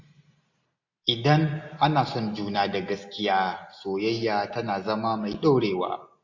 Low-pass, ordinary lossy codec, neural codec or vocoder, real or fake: 7.2 kHz; AAC, 48 kbps; none; real